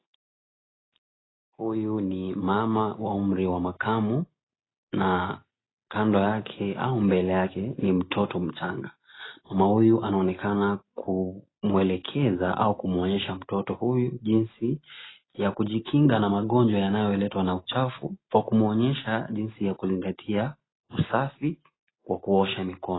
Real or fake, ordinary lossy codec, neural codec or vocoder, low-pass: real; AAC, 16 kbps; none; 7.2 kHz